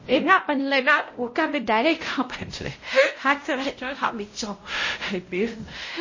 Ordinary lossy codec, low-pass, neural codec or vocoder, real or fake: MP3, 32 kbps; 7.2 kHz; codec, 16 kHz, 0.5 kbps, X-Codec, WavLM features, trained on Multilingual LibriSpeech; fake